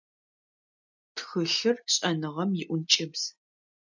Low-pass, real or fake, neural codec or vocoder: 7.2 kHz; real; none